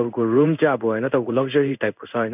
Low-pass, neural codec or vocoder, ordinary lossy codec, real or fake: 3.6 kHz; codec, 16 kHz in and 24 kHz out, 1 kbps, XY-Tokenizer; none; fake